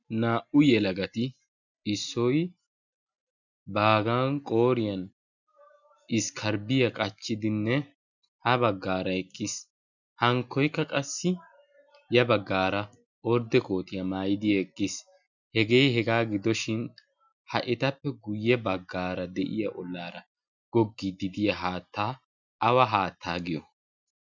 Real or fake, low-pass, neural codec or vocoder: real; 7.2 kHz; none